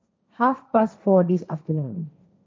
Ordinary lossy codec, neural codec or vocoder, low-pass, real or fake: none; codec, 16 kHz, 1.1 kbps, Voila-Tokenizer; none; fake